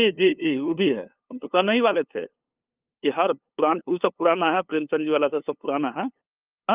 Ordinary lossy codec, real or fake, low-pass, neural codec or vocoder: Opus, 24 kbps; fake; 3.6 kHz; codec, 16 kHz, 2 kbps, FunCodec, trained on LibriTTS, 25 frames a second